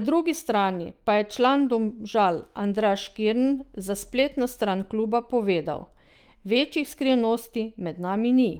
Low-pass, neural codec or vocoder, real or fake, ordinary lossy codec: 19.8 kHz; autoencoder, 48 kHz, 128 numbers a frame, DAC-VAE, trained on Japanese speech; fake; Opus, 24 kbps